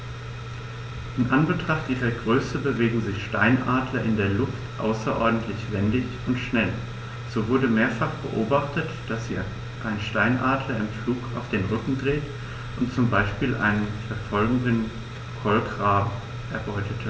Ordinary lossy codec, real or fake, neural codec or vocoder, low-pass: none; real; none; none